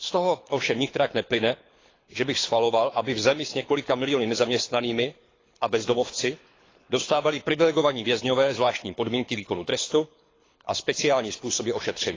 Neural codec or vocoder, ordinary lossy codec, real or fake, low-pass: codec, 24 kHz, 6 kbps, HILCodec; AAC, 32 kbps; fake; 7.2 kHz